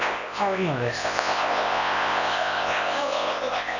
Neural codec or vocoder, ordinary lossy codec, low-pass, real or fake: codec, 24 kHz, 0.9 kbps, WavTokenizer, large speech release; none; 7.2 kHz; fake